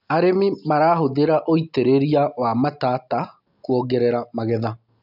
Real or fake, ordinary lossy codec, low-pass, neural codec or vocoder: real; none; 5.4 kHz; none